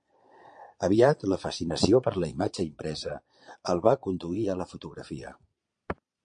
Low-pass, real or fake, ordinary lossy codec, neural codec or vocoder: 9.9 kHz; fake; MP3, 48 kbps; vocoder, 22.05 kHz, 80 mel bands, Vocos